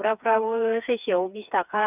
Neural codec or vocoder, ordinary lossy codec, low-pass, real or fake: codec, 16 kHz, 4 kbps, FreqCodec, smaller model; none; 3.6 kHz; fake